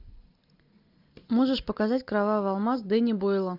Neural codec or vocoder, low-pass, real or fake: none; 5.4 kHz; real